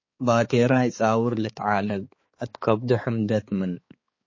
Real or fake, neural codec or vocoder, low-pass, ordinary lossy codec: fake; codec, 16 kHz, 4 kbps, X-Codec, HuBERT features, trained on balanced general audio; 7.2 kHz; MP3, 32 kbps